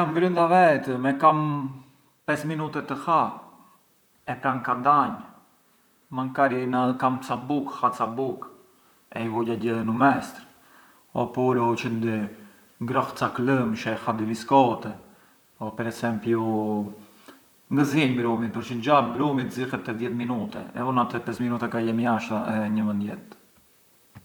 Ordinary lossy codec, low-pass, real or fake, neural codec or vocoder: none; none; fake; vocoder, 44.1 kHz, 128 mel bands, Pupu-Vocoder